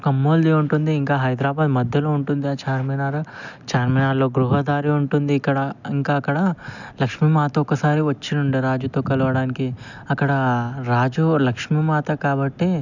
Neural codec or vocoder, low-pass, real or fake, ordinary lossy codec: none; 7.2 kHz; real; none